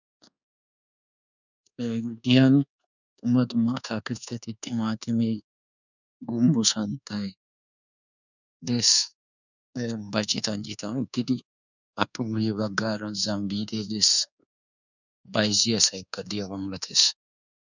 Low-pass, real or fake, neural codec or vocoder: 7.2 kHz; fake; codec, 24 kHz, 1.2 kbps, DualCodec